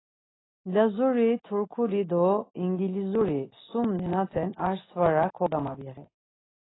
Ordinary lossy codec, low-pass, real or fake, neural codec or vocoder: AAC, 16 kbps; 7.2 kHz; real; none